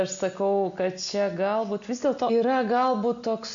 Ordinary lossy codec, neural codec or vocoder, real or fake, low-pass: MP3, 96 kbps; none; real; 7.2 kHz